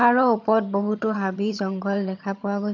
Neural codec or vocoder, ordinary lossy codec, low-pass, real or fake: vocoder, 22.05 kHz, 80 mel bands, HiFi-GAN; none; 7.2 kHz; fake